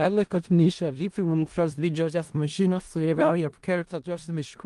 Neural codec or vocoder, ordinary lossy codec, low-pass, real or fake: codec, 16 kHz in and 24 kHz out, 0.4 kbps, LongCat-Audio-Codec, four codebook decoder; Opus, 24 kbps; 10.8 kHz; fake